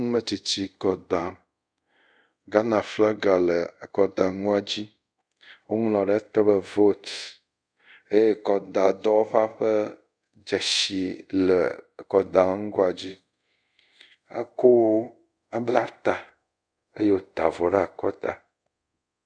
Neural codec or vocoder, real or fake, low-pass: codec, 24 kHz, 0.5 kbps, DualCodec; fake; 9.9 kHz